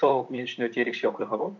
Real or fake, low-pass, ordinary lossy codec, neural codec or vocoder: fake; 7.2 kHz; MP3, 64 kbps; vocoder, 44.1 kHz, 128 mel bands, Pupu-Vocoder